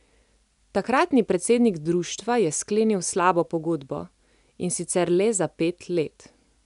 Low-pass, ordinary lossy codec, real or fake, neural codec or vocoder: 10.8 kHz; none; real; none